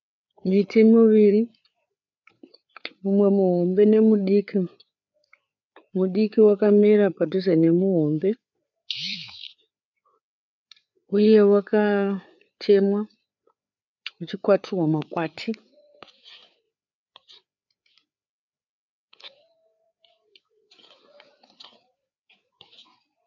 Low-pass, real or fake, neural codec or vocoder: 7.2 kHz; fake; codec, 16 kHz, 4 kbps, FreqCodec, larger model